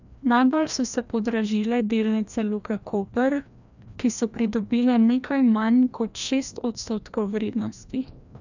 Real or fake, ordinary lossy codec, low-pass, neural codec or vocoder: fake; none; 7.2 kHz; codec, 16 kHz, 1 kbps, FreqCodec, larger model